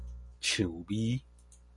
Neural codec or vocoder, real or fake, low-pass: none; real; 10.8 kHz